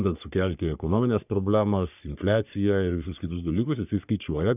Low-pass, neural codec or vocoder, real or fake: 3.6 kHz; codec, 44.1 kHz, 3.4 kbps, Pupu-Codec; fake